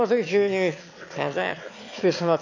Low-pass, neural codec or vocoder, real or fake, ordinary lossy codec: 7.2 kHz; autoencoder, 22.05 kHz, a latent of 192 numbers a frame, VITS, trained on one speaker; fake; AAC, 48 kbps